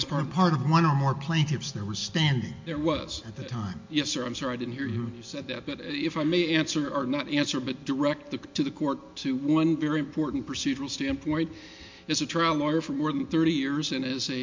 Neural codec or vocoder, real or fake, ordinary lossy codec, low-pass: none; real; MP3, 48 kbps; 7.2 kHz